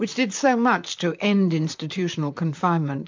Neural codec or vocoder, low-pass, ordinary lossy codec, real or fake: vocoder, 44.1 kHz, 128 mel bands, Pupu-Vocoder; 7.2 kHz; MP3, 64 kbps; fake